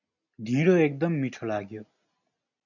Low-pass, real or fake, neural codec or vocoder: 7.2 kHz; real; none